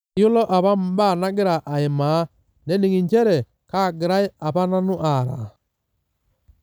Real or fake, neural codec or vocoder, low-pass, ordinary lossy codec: real; none; none; none